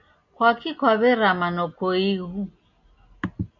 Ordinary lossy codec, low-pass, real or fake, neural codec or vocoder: MP3, 48 kbps; 7.2 kHz; real; none